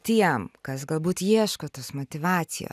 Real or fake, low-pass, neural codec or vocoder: real; 14.4 kHz; none